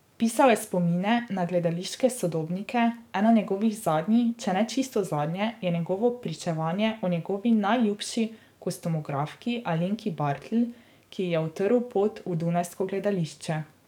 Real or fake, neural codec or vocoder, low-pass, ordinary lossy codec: fake; codec, 44.1 kHz, 7.8 kbps, DAC; 19.8 kHz; none